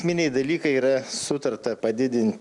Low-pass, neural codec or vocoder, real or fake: 10.8 kHz; none; real